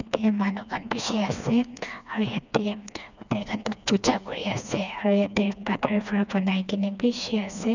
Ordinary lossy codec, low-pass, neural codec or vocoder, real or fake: none; 7.2 kHz; codec, 16 kHz, 2 kbps, FreqCodec, smaller model; fake